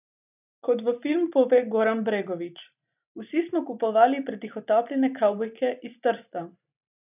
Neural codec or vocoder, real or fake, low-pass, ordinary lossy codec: none; real; 3.6 kHz; none